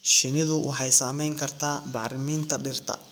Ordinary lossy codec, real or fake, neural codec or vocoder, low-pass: none; fake; codec, 44.1 kHz, 7.8 kbps, DAC; none